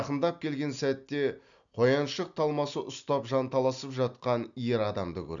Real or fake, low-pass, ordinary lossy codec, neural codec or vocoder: real; 7.2 kHz; none; none